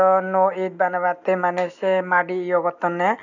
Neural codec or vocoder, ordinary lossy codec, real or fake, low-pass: none; none; real; 7.2 kHz